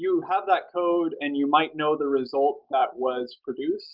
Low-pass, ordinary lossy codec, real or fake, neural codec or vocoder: 5.4 kHz; Opus, 32 kbps; real; none